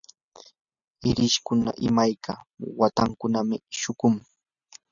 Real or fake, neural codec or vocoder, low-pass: real; none; 7.2 kHz